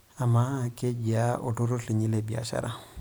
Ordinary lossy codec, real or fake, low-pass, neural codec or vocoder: none; fake; none; vocoder, 44.1 kHz, 128 mel bands every 512 samples, BigVGAN v2